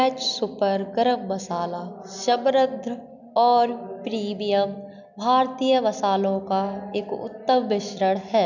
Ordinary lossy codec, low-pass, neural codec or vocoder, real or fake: none; 7.2 kHz; none; real